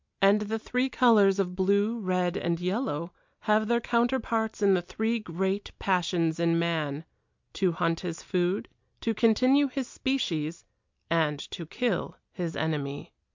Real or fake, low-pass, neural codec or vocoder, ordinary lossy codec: real; 7.2 kHz; none; MP3, 64 kbps